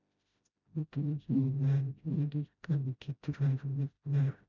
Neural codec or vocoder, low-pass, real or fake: codec, 16 kHz, 0.5 kbps, FreqCodec, smaller model; 7.2 kHz; fake